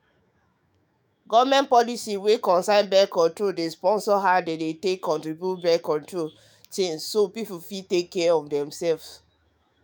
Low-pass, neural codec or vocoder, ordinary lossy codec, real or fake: none; autoencoder, 48 kHz, 128 numbers a frame, DAC-VAE, trained on Japanese speech; none; fake